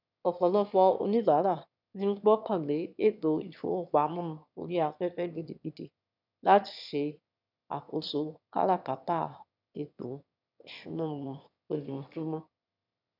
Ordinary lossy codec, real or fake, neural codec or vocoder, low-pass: none; fake; autoencoder, 22.05 kHz, a latent of 192 numbers a frame, VITS, trained on one speaker; 5.4 kHz